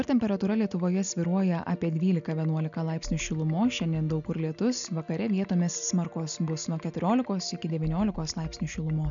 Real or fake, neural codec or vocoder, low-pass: real; none; 7.2 kHz